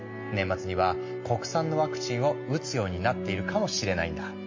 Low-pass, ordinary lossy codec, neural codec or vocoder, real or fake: 7.2 kHz; MP3, 32 kbps; none; real